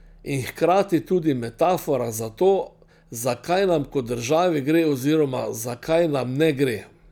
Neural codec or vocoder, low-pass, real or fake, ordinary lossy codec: none; 19.8 kHz; real; none